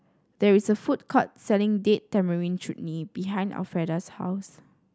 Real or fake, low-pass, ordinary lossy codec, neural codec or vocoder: real; none; none; none